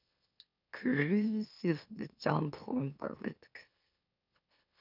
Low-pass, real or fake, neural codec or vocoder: 5.4 kHz; fake; autoencoder, 44.1 kHz, a latent of 192 numbers a frame, MeloTTS